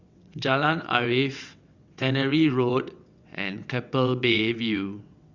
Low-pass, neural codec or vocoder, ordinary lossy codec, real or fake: 7.2 kHz; vocoder, 22.05 kHz, 80 mel bands, WaveNeXt; Opus, 64 kbps; fake